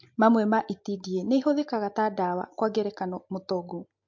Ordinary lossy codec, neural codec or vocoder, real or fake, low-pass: MP3, 48 kbps; none; real; 7.2 kHz